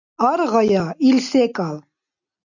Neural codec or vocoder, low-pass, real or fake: none; 7.2 kHz; real